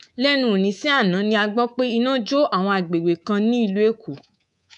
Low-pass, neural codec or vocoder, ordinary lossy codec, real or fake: 10.8 kHz; codec, 24 kHz, 3.1 kbps, DualCodec; none; fake